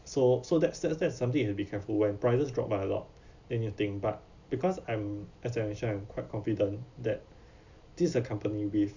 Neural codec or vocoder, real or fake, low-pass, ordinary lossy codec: none; real; 7.2 kHz; none